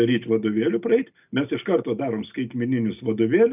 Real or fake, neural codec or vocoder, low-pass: real; none; 3.6 kHz